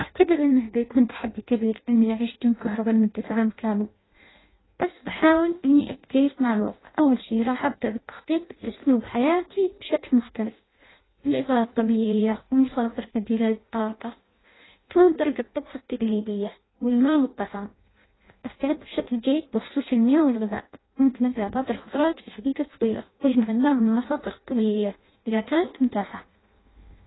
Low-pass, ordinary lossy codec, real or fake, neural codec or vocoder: 7.2 kHz; AAC, 16 kbps; fake; codec, 16 kHz in and 24 kHz out, 0.6 kbps, FireRedTTS-2 codec